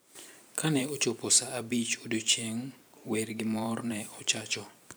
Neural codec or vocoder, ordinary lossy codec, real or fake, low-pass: vocoder, 44.1 kHz, 128 mel bands every 512 samples, BigVGAN v2; none; fake; none